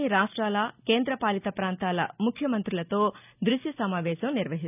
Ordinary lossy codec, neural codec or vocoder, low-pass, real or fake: none; none; 3.6 kHz; real